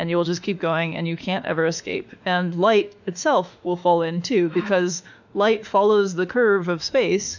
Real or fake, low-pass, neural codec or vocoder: fake; 7.2 kHz; autoencoder, 48 kHz, 32 numbers a frame, DAC-VAE, trained on Japanese speech